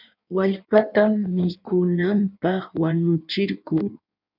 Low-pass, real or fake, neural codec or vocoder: 5.4 kHz; fake; codec, 16 kHz in and 24 kHz out, 1.1 kbps, FireRedTTS-2 codec